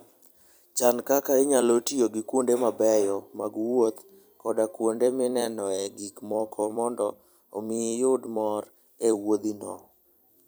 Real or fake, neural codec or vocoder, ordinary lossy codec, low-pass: fake; vocoder, 44.1 kHz, 128 mel bands every 256 samples, BigVGAN v2; none; none